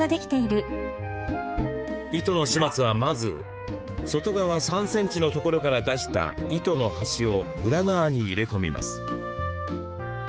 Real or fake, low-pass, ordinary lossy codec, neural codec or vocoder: fake; none; none; codec, 16 kHz, 4 kbps, X-Codec, HuBERT features, trained on general audio